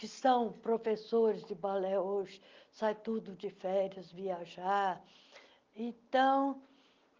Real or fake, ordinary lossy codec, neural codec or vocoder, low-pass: real; Opus, 32 kbps; none; 7.2 kHz